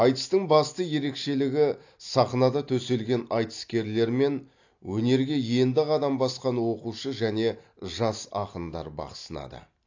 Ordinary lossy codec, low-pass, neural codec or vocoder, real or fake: AAC, 48 kbps; 7.2 kHz; none; real